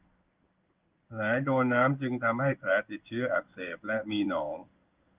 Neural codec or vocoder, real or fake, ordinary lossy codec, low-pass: codec, 16 kHz in and 24 kHz out, 1 kbps, XY-Tokenizer; fake; Opus, 24 kbps; 3.6 kHz